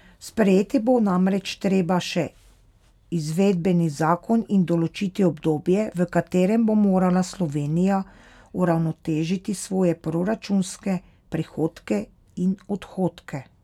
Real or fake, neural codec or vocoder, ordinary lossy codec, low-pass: real; none; none; 19.8 kHz